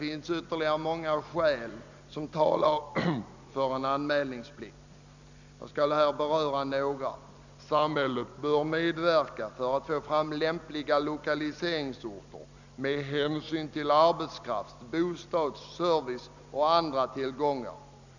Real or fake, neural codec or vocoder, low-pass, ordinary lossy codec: real; none; 7.2 kHz; none